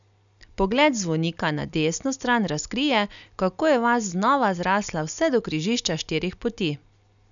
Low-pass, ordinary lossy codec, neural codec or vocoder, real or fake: 7.2 kHz; none; none; real